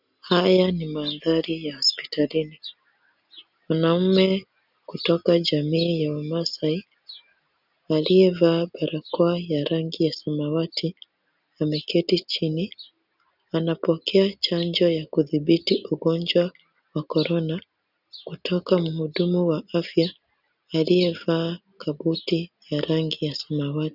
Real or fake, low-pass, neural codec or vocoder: real; 5.4 kHz; none